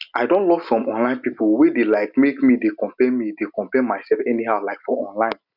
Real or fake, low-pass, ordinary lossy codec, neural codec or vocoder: real; 5.4 kHz; none; none